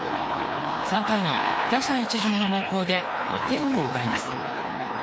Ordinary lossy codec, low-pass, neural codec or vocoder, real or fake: none; none; codec, 16 kHz, 2 kbps, FreqCodec, larger model; fake